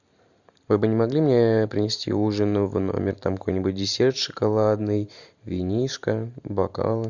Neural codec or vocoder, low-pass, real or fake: vocoder, 44.1 kHz, 128 mel bands every 512 samples, BigVGAN v2; 7.2 kHz; fake